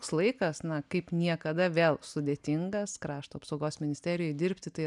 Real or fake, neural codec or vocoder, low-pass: real; none; 10.8 kHz